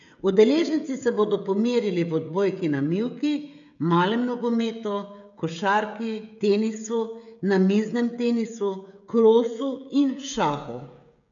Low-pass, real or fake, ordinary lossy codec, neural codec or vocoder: 7.2 kHz; fake; none; codec, 16 kHz, 16 kbps, FreqCodec, smaller model